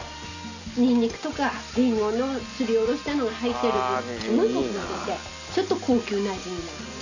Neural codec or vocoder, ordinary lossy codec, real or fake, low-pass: none; none; real; 7.2 kHz